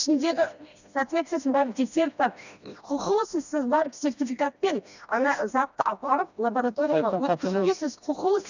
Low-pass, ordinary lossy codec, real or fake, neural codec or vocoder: 7.2 kHz; none; fake; codec, 16 kHz, 1 kbps, FreqCodec, smaller model